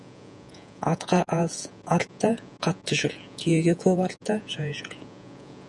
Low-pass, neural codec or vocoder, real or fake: 10.8 kHz; vocoder, 48 kHz, 128 mel bands, Vocos; fake